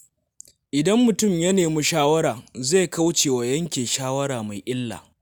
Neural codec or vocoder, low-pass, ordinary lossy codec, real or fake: none; none; none; real